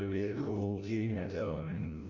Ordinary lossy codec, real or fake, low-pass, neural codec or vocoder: none; fake; 7.2 kHz; codec, 16 kHz, 0.5 kbps, FreqCodec, larger model